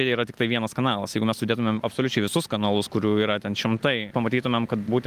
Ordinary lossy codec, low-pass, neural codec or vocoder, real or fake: Opus, 32 kbps; 14.4 kHz; none; real